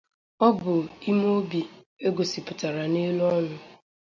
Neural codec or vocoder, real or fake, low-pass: none; real; 7.2 kHz